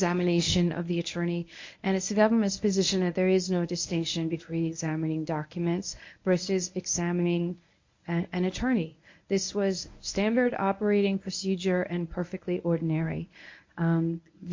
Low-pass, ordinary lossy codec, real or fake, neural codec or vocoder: 7.2 kHz; MP3, 48 kbps; fake; codec, 24 kHz, 0.9 kbps, WavTokenizer, medium speech release version 1